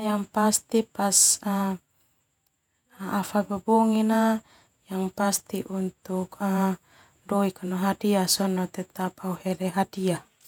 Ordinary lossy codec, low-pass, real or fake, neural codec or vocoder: none; 19.8 kHz; fake; vocoder, 48 kHz, 128 mel bands, Vocos